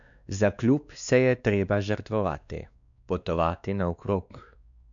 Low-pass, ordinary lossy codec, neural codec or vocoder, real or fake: 7.2 kHz; none; codec, 16 kHz, 2 kbps, X-Codec, WavLM features, trained on Multilingual LibriSpeech; fake